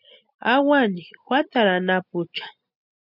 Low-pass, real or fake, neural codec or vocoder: 5.4 kHz; real; none